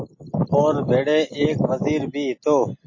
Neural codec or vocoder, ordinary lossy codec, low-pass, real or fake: none; MP3, 32 kbps; 7.2 kHz; real